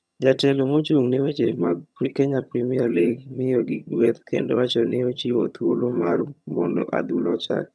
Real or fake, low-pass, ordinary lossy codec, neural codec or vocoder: fake; none; none; vocoder, 22.05 kHz, 80 mel bands, HiFi-GAN